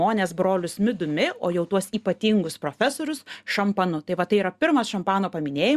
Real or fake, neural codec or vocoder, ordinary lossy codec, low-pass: real; none; Opus, 64 kbps; 14.4 kHz